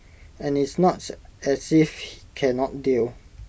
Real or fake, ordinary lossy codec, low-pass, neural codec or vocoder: real; none; none; none